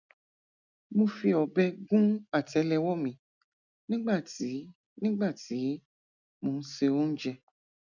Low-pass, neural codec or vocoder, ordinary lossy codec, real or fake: 7.2 kHz; none; none; real